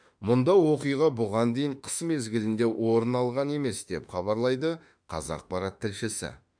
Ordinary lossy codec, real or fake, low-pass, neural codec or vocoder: none; fake; 9.9 kHz; autoencoder, 48 kHz, 32 numbers a frame, DAC-VAE, trained on Japanese speech